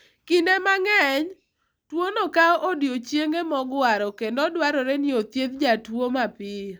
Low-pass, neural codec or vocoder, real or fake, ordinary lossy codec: none; none; real; none